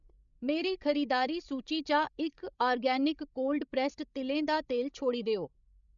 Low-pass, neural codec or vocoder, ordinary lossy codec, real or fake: 7.2 kHz; codec, 16 kHz, 16 kbps, FreqCodec, larger model; none; fake